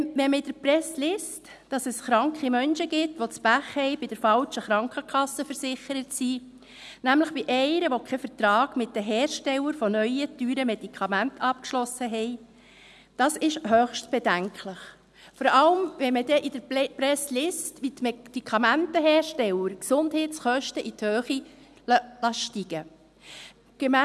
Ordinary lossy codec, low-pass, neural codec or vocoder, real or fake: none; none; none; real